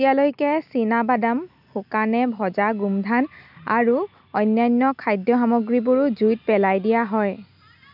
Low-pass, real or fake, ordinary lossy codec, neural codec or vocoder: 5.4 kHz; real; none; none